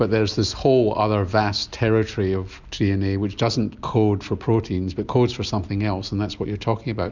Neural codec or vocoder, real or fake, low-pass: none; real; 7.2 kHz